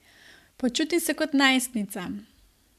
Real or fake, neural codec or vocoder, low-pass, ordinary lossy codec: fake; vocoder, 44.1 kHz, 128 mel bands every 512 samples, BigVGAN v2; 14.4 kHz; MP3, 96 kbps